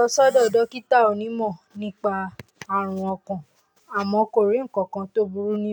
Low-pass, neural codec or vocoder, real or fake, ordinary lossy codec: none; none; real; none